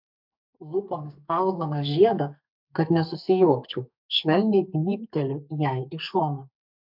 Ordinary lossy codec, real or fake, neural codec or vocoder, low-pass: MP3, 48 kbps; fake; codec, 44.1 kHz, 2.6 kbps, SNAC; 5.4 kHz